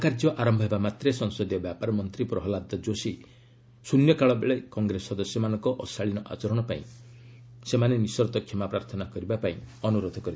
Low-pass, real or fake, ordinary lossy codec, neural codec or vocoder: none; real; none; none